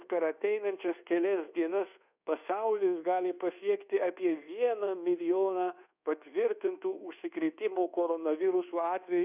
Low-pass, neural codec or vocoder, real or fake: 3.6 kHz; codec, 24 kHz, 1.2 kbps, DualCodec; fake